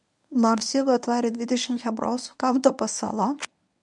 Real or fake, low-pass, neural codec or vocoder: fake; 10.8 kHz; codec, 24 kHz, 0.9 kbps, WavTokenizer, medium speech release version 1